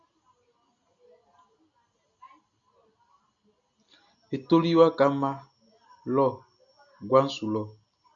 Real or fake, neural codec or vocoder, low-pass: real; none; 7.2 kHz